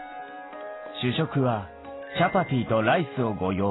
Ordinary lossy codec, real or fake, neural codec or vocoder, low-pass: AAC, 16 kbps; real; none; 7.2 kHz